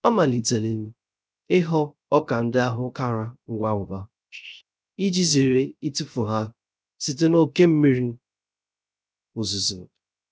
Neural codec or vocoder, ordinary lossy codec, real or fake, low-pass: codec, 16 kHz, 0.3 kbps, FocalCodec; none; fake; none